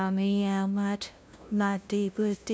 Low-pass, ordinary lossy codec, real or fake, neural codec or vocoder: none; none; fake; codec, 16 kHz, 0.5 kbps, FunCodec, trained on LibriTTS, 25 frames a second